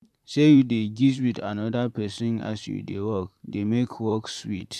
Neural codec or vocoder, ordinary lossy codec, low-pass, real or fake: vocoder, 44.1 kHz, 128 mel bands, Pupu-Vocoder; MP3, 96 kbps; 14.4 kHz; fake